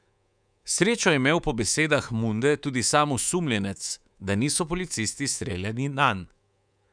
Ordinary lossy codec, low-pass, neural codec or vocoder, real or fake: none; 9.9 kHz; codec, 24 kHz, 3.1 kbps, DualCodec; fake